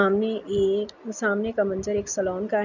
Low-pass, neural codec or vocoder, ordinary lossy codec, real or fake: 7.2 kHz; none; none; real